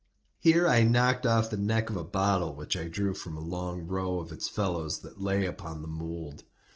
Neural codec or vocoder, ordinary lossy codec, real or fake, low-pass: none; Opus, 16 kbps; real; 7.2 kHz